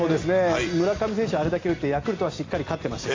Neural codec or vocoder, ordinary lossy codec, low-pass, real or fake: none; AAC, 32 kbps; 7.2 kHz; real